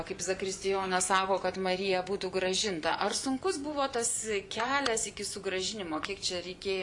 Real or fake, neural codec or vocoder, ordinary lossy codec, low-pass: fake; vocoder, 24 kHz, 100 mel bands, Vocos; AAC, 64 kbps; 10.8 kHz